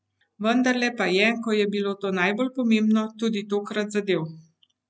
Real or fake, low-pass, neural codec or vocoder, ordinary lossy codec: real; none; none; none